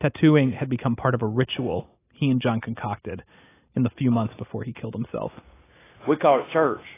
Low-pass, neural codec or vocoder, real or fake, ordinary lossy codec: 3.6 kHz; none; real; AAC, 16 kbps